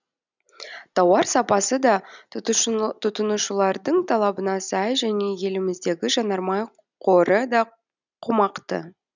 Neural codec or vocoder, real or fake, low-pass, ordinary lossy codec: none; real; 7.2 kHz; none